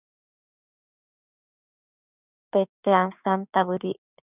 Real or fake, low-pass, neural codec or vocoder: fake; 3.6 kHz; codec, 16 kHz, 6 kbps, DAC